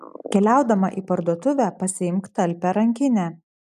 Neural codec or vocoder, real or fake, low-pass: none; real; 14.4 kHz